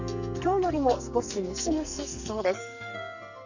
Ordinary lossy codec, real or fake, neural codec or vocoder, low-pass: none; fake; codec, 44.1 kHz, 2.6 kbps, SNAC; 7.2 kHz